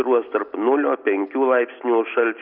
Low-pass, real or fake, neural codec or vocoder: 5.4 kHz; real; none